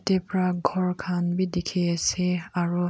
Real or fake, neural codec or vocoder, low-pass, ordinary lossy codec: real; none; none; none